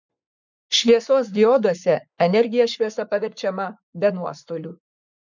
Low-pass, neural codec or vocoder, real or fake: 7.2 kHz; codec, 44.1 kHz, 7.8 kbps, Pupu-Codec; fake